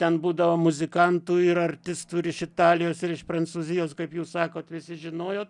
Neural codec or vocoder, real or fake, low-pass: none; real; 10.8 kHz